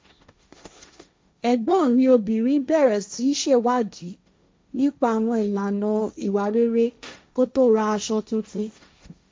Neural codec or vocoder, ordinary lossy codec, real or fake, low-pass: codec, 16 kHz, 1.1 kbps, Voila-Tokenizer; none; fake; none